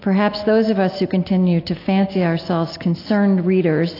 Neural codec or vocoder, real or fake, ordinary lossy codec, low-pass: none; real; AAC, 32 kbps; 5.4 kHz